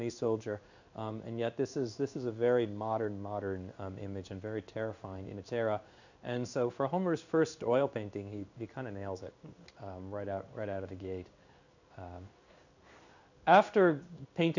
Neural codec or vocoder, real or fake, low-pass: codec, 16 kHz in and 24 kHz out, 1 kbps, XY-Tokenizer; fake; 7.2 kHz